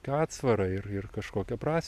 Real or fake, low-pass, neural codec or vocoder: fake; 14.4 kHz; vocoder, 44.1 kHz, 128 mel bands every 256 samples, BigVGAN v2